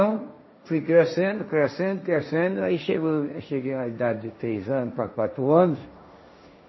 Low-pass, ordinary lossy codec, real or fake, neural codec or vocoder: 7.2 kHz; MP3, 24 kbps; fake; codec, 16 kHz, 1.1 kbps, Voila-Tokenizer